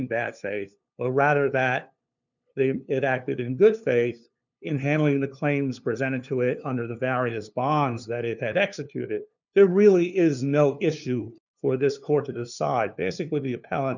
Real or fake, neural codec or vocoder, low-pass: fake; codec, 16 kHz, 2 kbps, FunCodec, trained on LibriTTS, 25 frames a second; 7.2 kHz